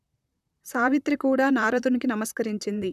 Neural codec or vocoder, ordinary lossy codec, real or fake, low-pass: vocoder, 44.1 kHz, 128 mel bands, Pupu-Vocoder; none; fake; 14.4 kHz